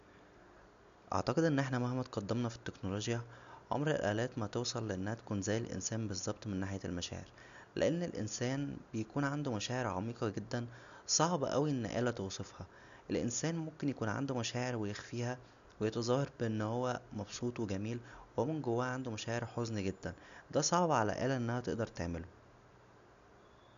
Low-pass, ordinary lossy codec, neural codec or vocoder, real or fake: 7.2 kHz; none; none; real